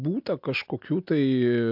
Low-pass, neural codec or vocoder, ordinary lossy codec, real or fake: 5.4 kHz; none; MP3, 48 kbps; real